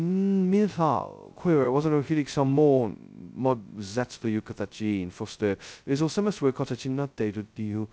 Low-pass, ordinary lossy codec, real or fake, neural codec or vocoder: none; none; fake; codec, 16 kHz, 0.2 kbps, FocalCodec